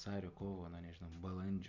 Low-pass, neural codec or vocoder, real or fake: 7.2 kHz; none; real